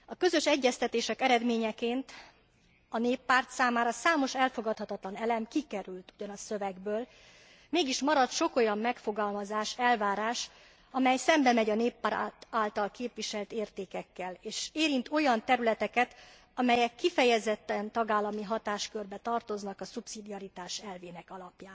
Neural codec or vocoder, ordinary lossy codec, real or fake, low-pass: none; none; real; none